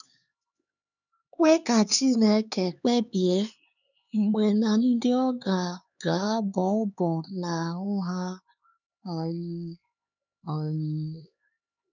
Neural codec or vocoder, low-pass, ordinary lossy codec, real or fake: codec, 16 kHz, 4 kbps, X-Codec, HuBERT features, trained on LibriSpeech; 7.2 kHz; none; fake